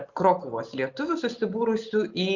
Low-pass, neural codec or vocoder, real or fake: 7.2 kHz; none; real